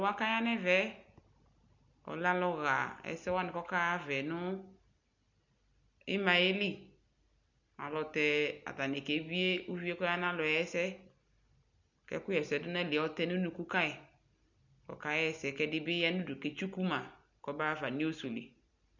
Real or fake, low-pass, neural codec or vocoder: real; 7.2 kHz; none